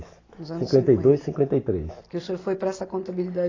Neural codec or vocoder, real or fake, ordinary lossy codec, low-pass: none; real; AAC, 32 kbps; 7.2 kHz